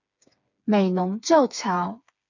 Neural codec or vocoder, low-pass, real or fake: codec, 16 kHz, 4 kbps, FreqCodec, smaller model; 7.2 kHz; fake